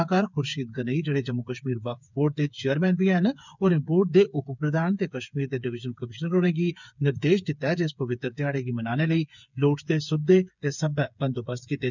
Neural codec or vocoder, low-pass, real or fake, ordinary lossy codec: codec, 16 kHz, 8 kbps, FreqCodec, smaller model; 7.2 kHz; fake; none